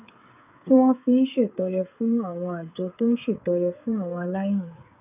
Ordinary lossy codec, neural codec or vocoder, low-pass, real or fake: none; codec, 16 kHz, 8 kbps, FreqCodec, smaller model; 3.6 kHz; fake